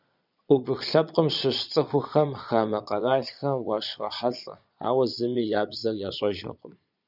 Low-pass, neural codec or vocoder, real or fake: 5.4 kHz; vocoder, 22.05 kHz, 80 mel bands, Vocos; fake